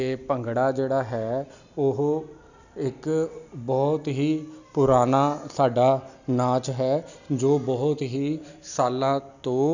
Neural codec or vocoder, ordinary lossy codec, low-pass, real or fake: none; none; 7.2 kHz; real